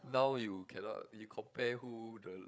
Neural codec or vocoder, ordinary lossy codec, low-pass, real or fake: codec, 16 kHz, 8 kbps, FreqCodec, larger model; none; none; fake